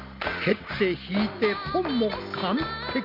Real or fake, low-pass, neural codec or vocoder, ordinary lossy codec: real; 5.4 kHz; none; none